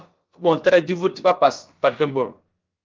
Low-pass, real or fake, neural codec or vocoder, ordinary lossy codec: 7.2 kHz; fake; codec, 16 kHz, about 1 kbps, DyCAST, with the encoder's durations; Opus, 32 kbps